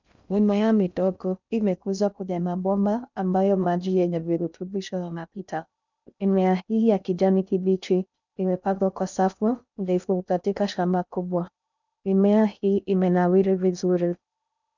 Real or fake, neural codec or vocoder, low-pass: fake; codec, 16 kHz in and 24 kHz out, 0.6 kbps, FocalCodec, streaming, 2048 codes; 7.2 kHz